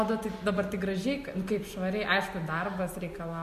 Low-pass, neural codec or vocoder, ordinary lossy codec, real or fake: 14.4 kHz; none; MP3, 64 kbps; real